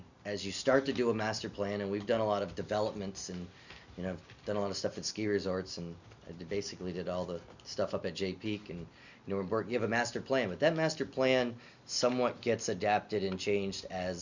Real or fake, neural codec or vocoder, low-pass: real; none; 7.2 kHz